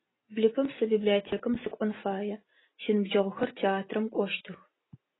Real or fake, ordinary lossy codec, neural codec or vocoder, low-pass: real; AAC, 16 kbps; none; 7.2 kHz